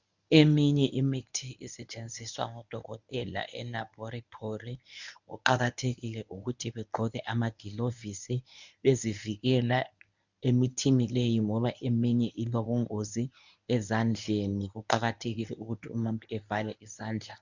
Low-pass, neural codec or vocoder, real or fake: 7.2 kHz; codec, 24 kHz, 0.9 kbps, WavTokenizer, medium speech release version 1; fake